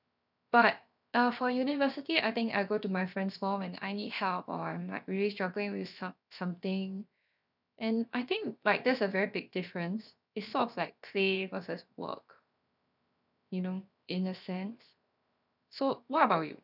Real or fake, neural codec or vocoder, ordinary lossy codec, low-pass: fake; codec, 16 kHz, 0.7 kbps, FocalCodec; none; 5.4 kHz